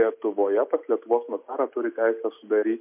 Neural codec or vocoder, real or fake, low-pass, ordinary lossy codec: none; real; 3.6 kHz; MP3, 32 kbps